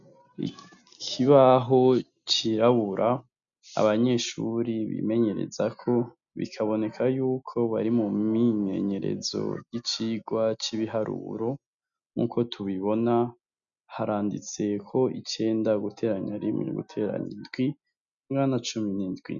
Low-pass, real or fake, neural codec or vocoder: 7.2 kHz; real; none